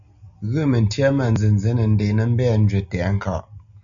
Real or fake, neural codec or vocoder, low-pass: real; none; 7.2 kHz